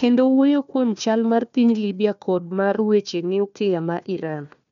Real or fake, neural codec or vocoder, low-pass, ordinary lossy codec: fake; codec, 16 kHz, 1 kbps, FunCodec, trained on Chinese and English, 50 frames a second; 7.2 kHz; none